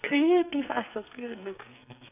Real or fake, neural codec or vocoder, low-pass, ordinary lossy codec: fake; codec, 44.1 kHz, 2.6 kbps, SNAC; 3.6 kHz; none